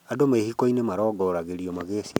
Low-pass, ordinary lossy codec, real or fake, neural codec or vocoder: 19.8 kHz; none; real; none